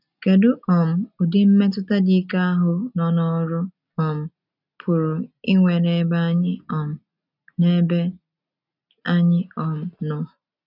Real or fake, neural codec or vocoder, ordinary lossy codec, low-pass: real; none; none; 5.4 kHz